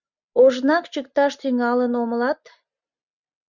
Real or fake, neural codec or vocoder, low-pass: real; none; 7.2 kHz